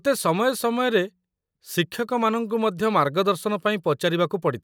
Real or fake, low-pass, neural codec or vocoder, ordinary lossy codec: real; none; none; none